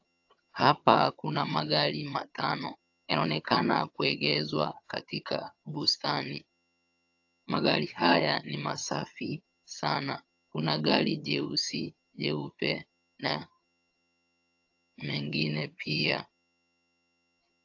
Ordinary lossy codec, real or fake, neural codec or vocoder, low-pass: AAC, 48 kbps; fake; vocoder, 22.05 kHz, 80 mel bands, HiFi-GAN; 7.2 kHz